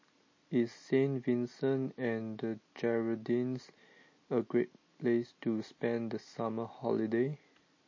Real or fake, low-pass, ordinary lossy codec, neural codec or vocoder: real; 7.2 kHz; MP3, 32 kbps; none